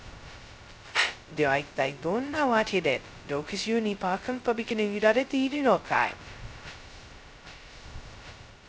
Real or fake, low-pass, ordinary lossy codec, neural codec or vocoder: fake; none; none; codec, 16 kHz, 0.2 kbps, FocalCodec